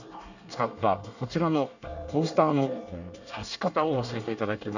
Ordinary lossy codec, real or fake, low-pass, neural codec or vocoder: none; fake; 7.2 kHz; codec, 24 kHz, 1 kbps, SNAC